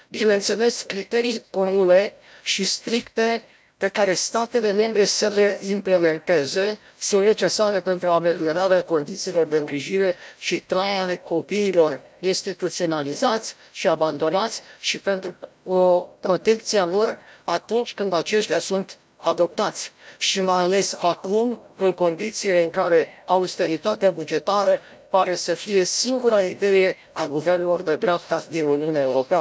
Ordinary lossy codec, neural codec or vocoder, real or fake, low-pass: none; codec, 16 kHz, 0.5 kbps, FreqCodec, larger model; fake; none